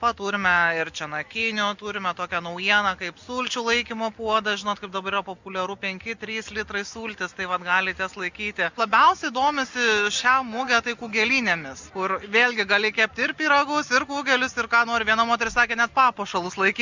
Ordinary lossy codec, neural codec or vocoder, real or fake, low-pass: Opus, 64 kbps; none; real; 7.2 kHz